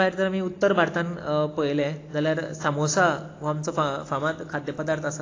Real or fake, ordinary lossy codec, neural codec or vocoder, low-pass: real; AAC, 32 kbps; none; 7.2 kHz